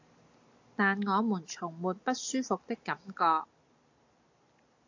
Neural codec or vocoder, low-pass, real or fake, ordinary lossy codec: none; 7.2 kHz; real; AAC, 48 kbps